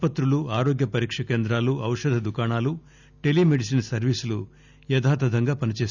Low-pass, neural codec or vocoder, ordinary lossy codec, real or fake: 7.2 kHz; none; none; real